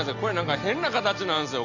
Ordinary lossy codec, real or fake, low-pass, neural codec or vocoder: none; real; 7.2 kHz; none